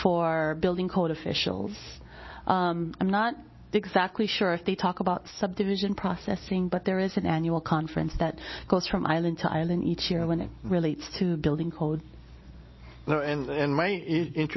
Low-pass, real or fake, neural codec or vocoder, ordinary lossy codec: 7.2 kHz; real; none; MP3, 24 kbps